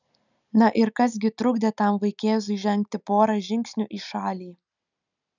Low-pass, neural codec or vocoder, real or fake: 7.2 kHz; none; real